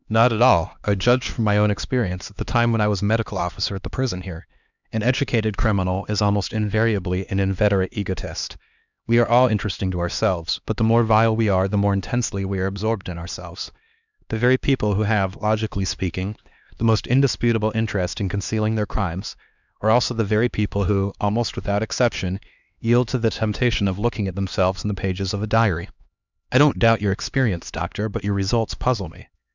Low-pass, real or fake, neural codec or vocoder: 7.2 kHz; fake; codec, 16 kHz, 2 kbps, X-Codec, HuBERT features, trained on LibriSpeech